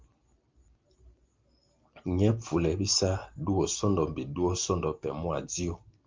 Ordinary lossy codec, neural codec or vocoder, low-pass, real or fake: Opus, 32 kbps; none; 7.2 kHz; real